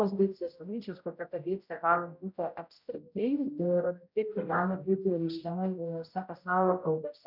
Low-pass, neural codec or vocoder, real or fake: 5.4 kHz; codec, 16 kHz, 0.5 kbps, X-Codec, HuBERT features, trained on general audio; fake